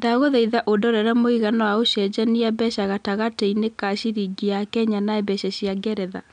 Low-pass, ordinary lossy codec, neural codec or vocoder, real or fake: 9.9 kHz; none; none; real